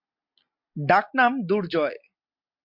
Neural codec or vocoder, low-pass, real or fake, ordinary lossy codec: none; 5.4 kHz; real; MP3, 48 kbps